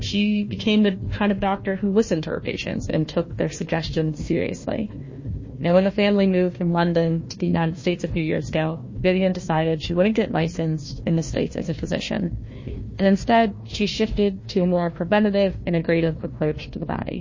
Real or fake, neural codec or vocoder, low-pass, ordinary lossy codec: fake; codec, 16 kHz, 1 kbps, FunCodec, trained on Chinese and English, 50 frames a second; 7.2 kHz; MP3, 32 kbps